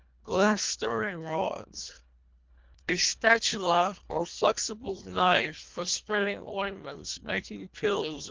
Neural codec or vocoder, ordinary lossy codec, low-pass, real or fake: codec, 24 kHz, 1.5 kbps, HILCodec; Opus, 24 kbps; 7.2 kHz; fake